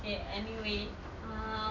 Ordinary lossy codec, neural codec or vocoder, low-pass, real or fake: none; none; 7.2 kHz; real